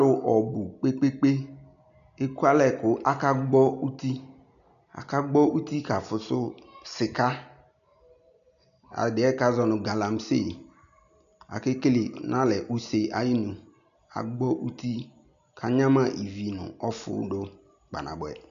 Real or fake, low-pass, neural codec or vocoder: real; 7.2 kHz; none